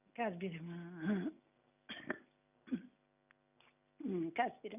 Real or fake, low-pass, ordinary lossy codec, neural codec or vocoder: real; 3.6 kHz; none; none